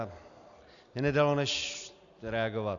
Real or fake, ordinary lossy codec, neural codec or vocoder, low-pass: real; MP3, 96 kbps; none; 7.2 kHz